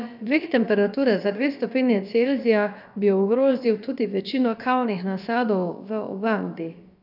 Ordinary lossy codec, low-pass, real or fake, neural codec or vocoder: none; 5.4 kHz; fake; codec, 16 kHz, about 1 kbps, DyCAST, with the encoder's durations